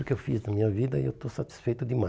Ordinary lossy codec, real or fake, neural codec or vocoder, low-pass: none; real; none; none